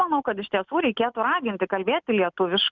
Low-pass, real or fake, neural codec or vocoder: 7.2 kHz; real; none